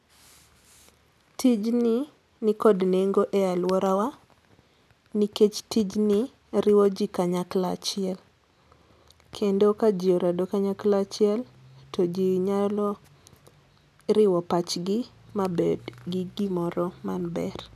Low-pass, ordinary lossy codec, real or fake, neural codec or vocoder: 14.4 kHz; none; real; none